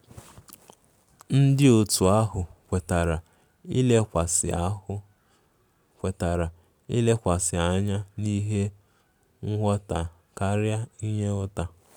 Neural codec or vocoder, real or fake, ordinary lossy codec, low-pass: none; real; none; none